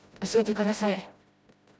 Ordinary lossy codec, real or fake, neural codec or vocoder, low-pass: none; fake; codec, 16 kHz, 0.5 kbps, FreqCodec, smaller model; none